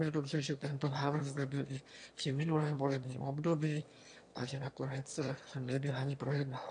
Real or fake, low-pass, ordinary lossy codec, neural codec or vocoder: fake; 9.9 kHz; AAC, 64 kbps; autoencoder, 22.05 kHz, a latent of 192 numbers a frame, VITS, trained on one speaker